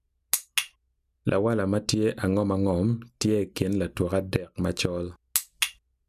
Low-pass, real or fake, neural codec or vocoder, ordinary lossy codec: 14.4 kHz; real; none; none